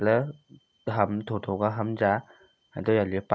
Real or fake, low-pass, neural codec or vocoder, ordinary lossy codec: real; none; none; none